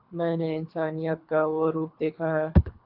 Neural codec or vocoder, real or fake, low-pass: codec, 24 kHz, 3 kbps, HILCodec; fake; 5.4 kHz